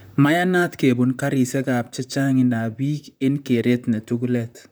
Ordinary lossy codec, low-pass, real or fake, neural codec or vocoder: none; none; fake; vocoder, 44.1 kHz, 128 mel bands, Pupu-Vocoder